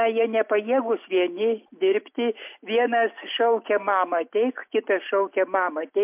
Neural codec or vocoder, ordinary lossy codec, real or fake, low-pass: none; MP3, 32 kbps; real; 3.6 kHz